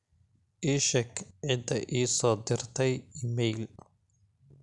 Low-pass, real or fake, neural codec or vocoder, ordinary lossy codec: 10.8 kHz; real; none; none